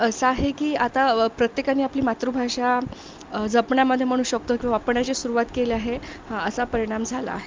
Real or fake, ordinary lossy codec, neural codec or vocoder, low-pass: real; Opus, 24 kbps; none; 7.2 kHz